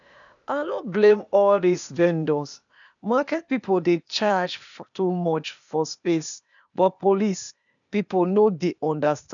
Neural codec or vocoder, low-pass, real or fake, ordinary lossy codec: codec, 16 kHz, 0.8 kbps, ZipCodec; 7.2 kHz; fake; none